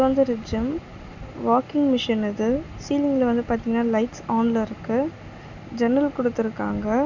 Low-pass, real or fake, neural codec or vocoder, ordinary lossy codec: 7.2 kHz; real; none; none